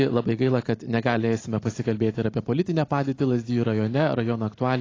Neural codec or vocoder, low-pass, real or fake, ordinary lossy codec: none; 7.2 kHz; real; AAC, 32 kbps